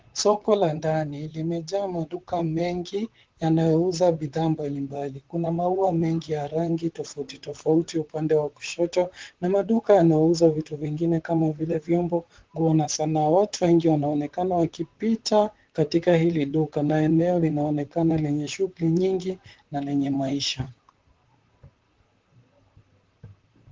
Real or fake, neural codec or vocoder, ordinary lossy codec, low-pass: fake; vocoder, 44.1 kHz, 128 mel bands, Pupu-Vocoder; Opus, 16 kbps; 7.2 kHz